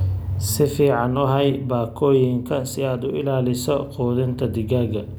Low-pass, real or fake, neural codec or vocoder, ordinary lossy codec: none; real; none; none